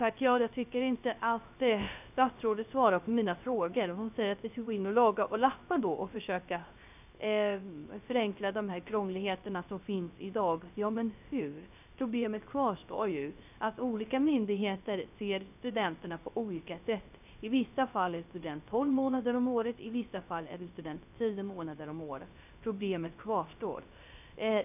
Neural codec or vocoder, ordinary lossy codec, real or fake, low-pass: codec, 16 kHz, 0.3 kbps, FocalCodec; none; fake; 3.6 kHz